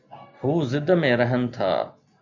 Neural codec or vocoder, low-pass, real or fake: none; 7.2 kHz; real